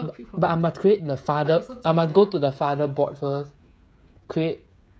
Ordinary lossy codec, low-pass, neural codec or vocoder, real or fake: none; none; codec, 16 kHz, 16 kbps, FreqCodec, smaller model; fake